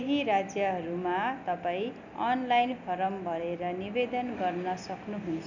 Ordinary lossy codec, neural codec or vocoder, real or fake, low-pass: none; none; real; 7.2 kHz